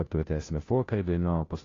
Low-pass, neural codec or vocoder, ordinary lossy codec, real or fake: 7.2 kHz; codec, 16 kHz, 0.5 kbps, FunCodec, trained on Chinese and English, 25 frames a second; AAC, 32 kbps; fake